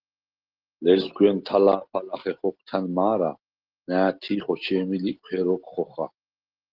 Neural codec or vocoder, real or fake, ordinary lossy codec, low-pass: none; real; Opus, 16 kbps; 5.4 kHz